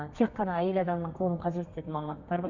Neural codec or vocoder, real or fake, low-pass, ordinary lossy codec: codec, 32 kHz, 1.9 kbps, SNAC; fake; 7.2 kHz; none